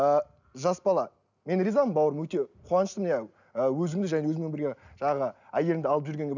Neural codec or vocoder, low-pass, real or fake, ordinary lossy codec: none; 7.2 kHz; real; none